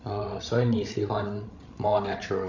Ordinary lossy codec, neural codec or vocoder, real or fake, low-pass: none; codec, 16 kHz, 16 kbps, FreqCodec, larger model; fake; 7.2 kHz